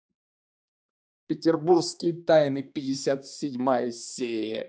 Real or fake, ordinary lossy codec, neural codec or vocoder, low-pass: fake; none; codec, 16 kHz, 2 kbps, X-Codec, HuBERT features, trained on balanced general audio; none